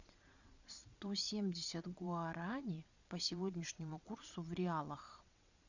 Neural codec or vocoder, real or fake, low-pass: vocoder, 22.05 kHz, 80 mel bands, Vocos; fake; 7.2 kHz